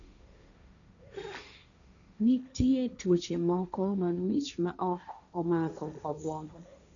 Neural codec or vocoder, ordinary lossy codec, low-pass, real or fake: codec, 16 kHz, 1.1 kbps, Voila-Tokenizer; none; 7.2 kHz; fake